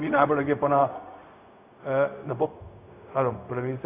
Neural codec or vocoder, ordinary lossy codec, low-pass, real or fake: codec, 16 kHz, 0.4 kbps, LongCat-Audio-Codec; AAC, 24 kbps; 3.6 kHz; fake